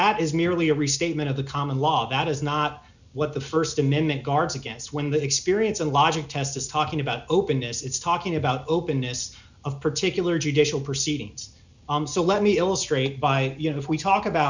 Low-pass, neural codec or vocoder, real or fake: 7.2 kHz; none; real